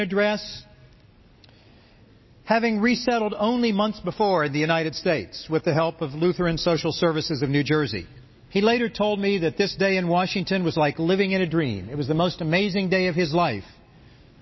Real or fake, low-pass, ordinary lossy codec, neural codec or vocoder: real; 7.2 kHz; MP3, 24 kbps; none